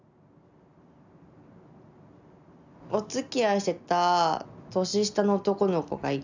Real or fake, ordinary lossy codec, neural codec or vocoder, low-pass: real; none; none; 7.2 kHz